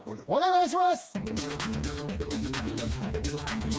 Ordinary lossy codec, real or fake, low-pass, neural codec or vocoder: none; fake; none; codec, 16 kHz, 2 kbps, FreqCodec, smaller model